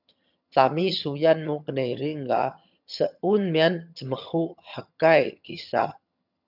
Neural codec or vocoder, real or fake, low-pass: vocoder, 22.05 kHz, 80 mel bands, HiFi-GAN; fake; 5.4 kHz